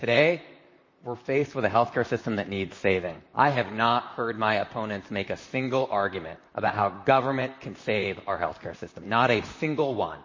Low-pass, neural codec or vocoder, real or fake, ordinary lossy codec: 7.2 kHz; vocoder, 44.1 kHz, 128 mel bands, Pupu-Vocoder; fake; MP3, 32 kbps